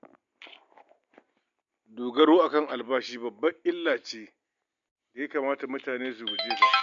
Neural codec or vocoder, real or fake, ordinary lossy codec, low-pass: none; real; MP3, 64 kbps; 7.2 kHz